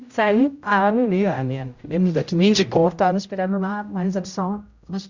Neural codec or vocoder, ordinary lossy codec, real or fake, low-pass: codec, 16 kHz, 0.5 kbps, X-Codec, HuBERT features, trained on general audio; Opus, 64 kbps; fake; 7.2 kHz